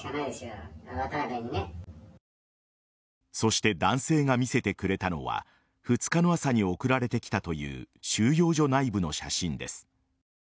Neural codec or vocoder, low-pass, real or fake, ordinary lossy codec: none; none; real; none